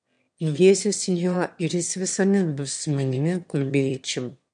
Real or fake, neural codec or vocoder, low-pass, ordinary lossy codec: fake; autoencoder, 22.05 kHz, a latent of 192 numbers a frame, VITS, trained on one speaker; 9.9 kHz; MP3, 64 kbps